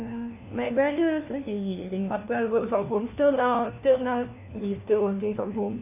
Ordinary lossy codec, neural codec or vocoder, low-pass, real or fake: none; codec, 16 kHz, 1 kbps, FunCodec, trained on LibriTTS, 50 frames a second; 3.6 kHz; fake